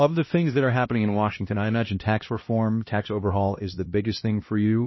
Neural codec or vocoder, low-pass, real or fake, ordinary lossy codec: codec, 16 kHz, 1 kbps, X-Codec, HuBERT features, trained on LibriSpeech; 7.2 kHz; fake; MP3, 24 kbps